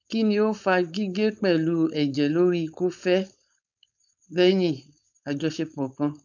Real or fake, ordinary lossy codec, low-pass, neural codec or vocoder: fake; none; 7.2 kHz; codec, 16 kHz, 4.8 kbps, FACodec